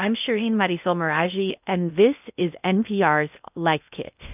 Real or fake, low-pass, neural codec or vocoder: fake; 3.6 kHz; codec, 16 kHz in and 24 kHz out, 0.6 kbps, FocalCodec, streaming, 2048 codes